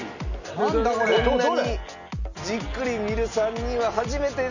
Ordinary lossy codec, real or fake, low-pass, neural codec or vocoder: none; real; 7.2 kHz; none